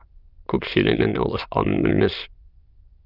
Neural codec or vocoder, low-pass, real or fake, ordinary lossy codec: autoencoder, 22.05 kHz, a latent of 192 numbers a frame, VITS, trained on many speakers; 5.4 kHz; fake; Opus, 32 kbps